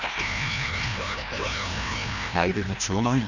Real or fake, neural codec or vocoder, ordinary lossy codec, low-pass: fake; codec, 16 kHz, 1 kbps, FreqCodec, larger model; none; 7.2 kHz